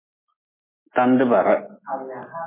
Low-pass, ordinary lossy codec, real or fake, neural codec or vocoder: 3.6 kHz; MP3, 16 kbps; real; none